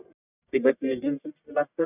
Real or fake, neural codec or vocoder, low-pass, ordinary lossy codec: fake; codec, 44.1 kHz, 1.7 kbps, Pupu-Codec; 3.6 kHz; none